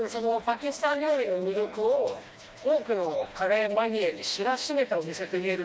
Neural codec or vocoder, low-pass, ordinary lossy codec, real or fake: codec, 16 kHz, 1 kbps, FreqCodec, smaller model; none; none; fake